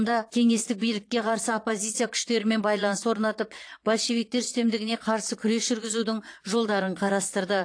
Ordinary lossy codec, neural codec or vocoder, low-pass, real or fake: AAC, 48 kbps; vocoder, 22.05 kHz, 80 mel bands, Vocos; 9.9 kHz; fake